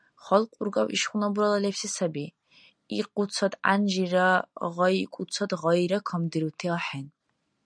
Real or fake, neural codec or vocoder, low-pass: real; none; 9.9 kHz